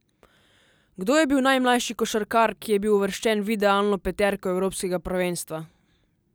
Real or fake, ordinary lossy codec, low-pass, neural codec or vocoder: real; none; none; none